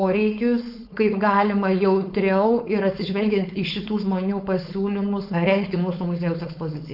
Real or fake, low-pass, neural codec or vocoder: fake; 5.4 kHz; codec, 16 kHz, 4.8 kbps, FACodec